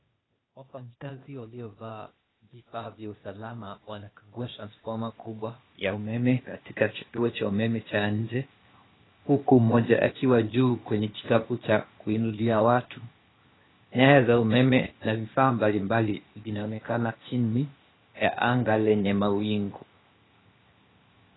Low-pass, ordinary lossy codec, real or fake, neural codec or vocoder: 7.2 kHz; AAC, 16 kbps; fake; codec, 16 kHz, 0.8 kbps, ZipCodec